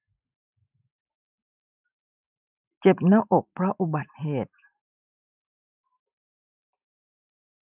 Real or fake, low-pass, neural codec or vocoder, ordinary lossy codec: fake; 3.6 kHz; vocoder, 44.1 kHz, 128 mel bands every 512 samples, BigVGAN v2; none